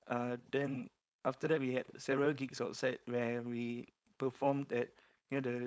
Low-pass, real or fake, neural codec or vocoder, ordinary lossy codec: none; fake; codec, 16 kHz, 4.8 kbps, FACodec; none